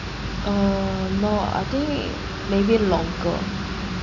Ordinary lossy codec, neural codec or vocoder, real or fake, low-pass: none; none; real; 7.2 kHz